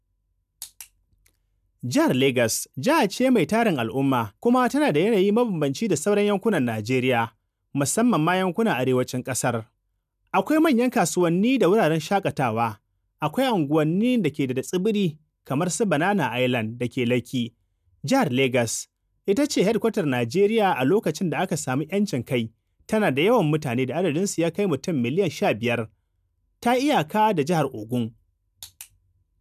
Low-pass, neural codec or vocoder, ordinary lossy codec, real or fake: 14.4 kHz; none; none; real